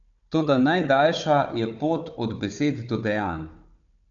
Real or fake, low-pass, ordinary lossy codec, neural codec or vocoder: fake; 7.2 kHz; none; codec, 16 kHz, 4 kbps, FunCodec, trained on Chinese and English, 50 frames a second